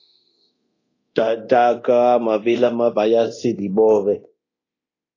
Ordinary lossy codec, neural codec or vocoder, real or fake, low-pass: AAC, 32 kbps; codec, 24 kHz, 0.9 kbps, DualCodec; fake; 7.2 kHz